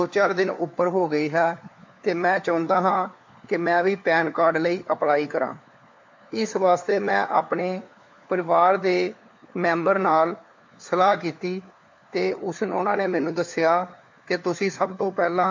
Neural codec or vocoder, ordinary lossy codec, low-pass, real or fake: codec, 16 kHz, 4 kbps, FunCodec, trained on LibriTTS, 50 frames a second; MP3, 48 kbps; 7.2 kHz; fake